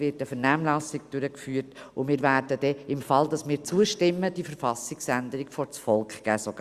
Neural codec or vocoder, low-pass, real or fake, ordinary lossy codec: none; 14.4 kHz; real; Opus, 64 kbps